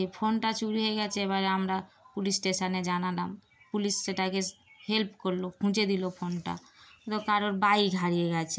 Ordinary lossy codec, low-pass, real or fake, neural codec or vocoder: none; none; real; none